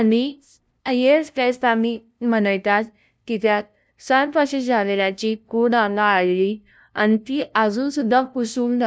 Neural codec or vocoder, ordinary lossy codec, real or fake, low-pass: codec, 16 kHz, 0.5 kbps, FunCodec, trained on LibriTTS, 25 frames a second; none; fake; none